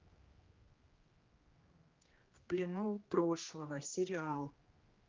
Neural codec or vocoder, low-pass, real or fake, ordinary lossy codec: codec, 16 kHz, 1 kbps, X-Codec, HuBERT features, trained on general audio; 7.2 kHz; fake; Opus, 32 kbps